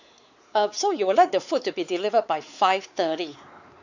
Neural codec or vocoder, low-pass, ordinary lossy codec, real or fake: codec, 16 kHz, 4 kbps, X-Codec, WavLM features, trained on Multilingual LibriSpeech; 7.2 kHz; none; fake